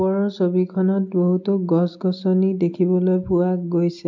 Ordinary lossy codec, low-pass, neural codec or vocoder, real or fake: MP3, 64 kbps; 7.2 kHz; none; real